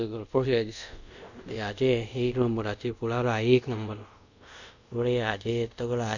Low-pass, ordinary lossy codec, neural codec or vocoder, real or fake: 7.2 kHz; none; codec, 24 kHz, 0.5 kbps, DualCodec; fake